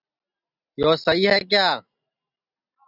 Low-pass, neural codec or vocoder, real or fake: 5.4 kHz; none; real